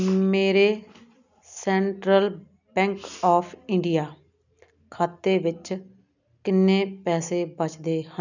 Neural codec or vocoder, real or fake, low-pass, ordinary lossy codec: none; real; 7.2 kHz; none